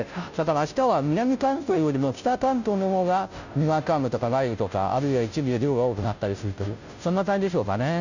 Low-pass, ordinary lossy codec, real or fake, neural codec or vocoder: 7.2 kHz; none; fake; codec, 16 kHz, 0.5 kbps, FunCodec, trained on Chinese and English, 25 frames a second